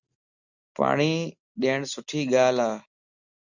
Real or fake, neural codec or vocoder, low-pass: real; none; 7.2 kHz